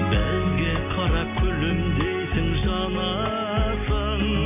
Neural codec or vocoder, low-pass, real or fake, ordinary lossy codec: none; 3.6 kHz; real; MP3, 32 kbps